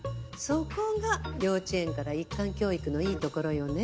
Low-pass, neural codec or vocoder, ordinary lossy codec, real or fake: none; none; none; real